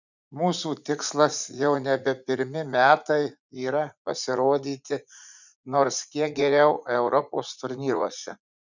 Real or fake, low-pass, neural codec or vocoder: fake; 7.2 kHz; vocoder, 44.1 kHz, 80 mel bands, Vocos